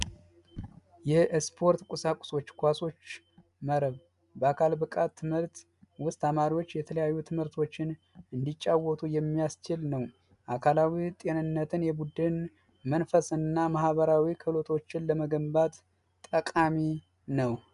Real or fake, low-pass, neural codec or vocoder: real; 10.8 kHz; none